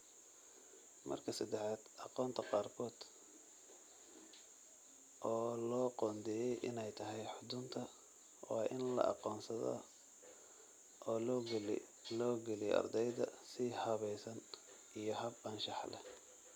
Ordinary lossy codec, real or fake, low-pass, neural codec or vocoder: none; real; none; none